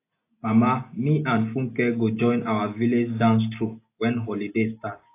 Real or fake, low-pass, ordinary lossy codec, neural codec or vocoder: real; 3.6 kHz; AAC, 24 kbps; none